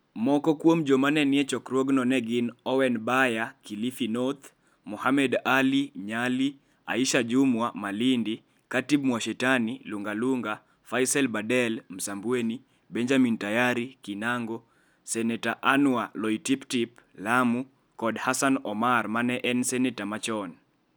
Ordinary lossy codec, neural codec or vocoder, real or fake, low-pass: none; none; real; none